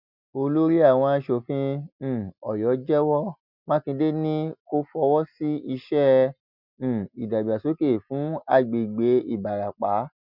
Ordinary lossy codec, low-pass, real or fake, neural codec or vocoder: none; 5.4 kHz; real; none